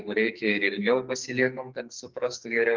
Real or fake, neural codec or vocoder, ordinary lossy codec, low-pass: fake; codec, 44.1 kHz, 2.6 kbps, SNAC; Opus, 24 kbps; 7.2 kHz